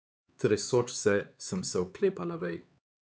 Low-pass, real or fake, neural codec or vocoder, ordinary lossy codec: none; fake; codec, 16 kHz, 4 kbps, X-Codec, HuBERT features, trained on LibriSpeech; none